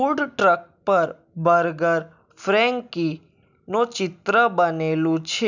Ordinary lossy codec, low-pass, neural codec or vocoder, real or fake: none; 7.2 kHz; none; real